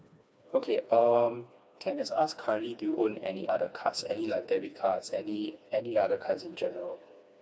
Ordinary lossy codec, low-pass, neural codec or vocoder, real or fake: none; none; codec, 16 kHz, 2 kbps, FreqCodec, smaller model; fake